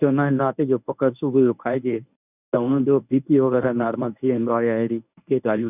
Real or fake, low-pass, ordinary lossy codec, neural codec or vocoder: fake; 3.6 kHz; none; codec, 24 kHz, 0.9 kbps, WavTokenizer, medium speech release version 1